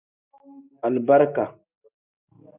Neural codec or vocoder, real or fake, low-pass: none; real; 3.6 kHz